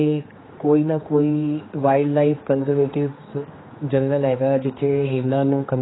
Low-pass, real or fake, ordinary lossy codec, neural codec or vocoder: 7.2 kHz; fake; AAC, 16 kbps; codec, 16 kHz, 2 kbps, X-Codec, HuBERT features, trained on general audio